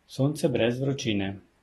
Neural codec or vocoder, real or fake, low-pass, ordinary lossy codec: none; real; 19.8 kHz; AAC, 32 kbps